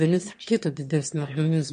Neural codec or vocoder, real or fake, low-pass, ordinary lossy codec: autoencoder, 22.05 kHz, a latent of 192 numbers a frame, VITS, trained on one speaker; fake; 9.9 kHz; MP3, 48 kbps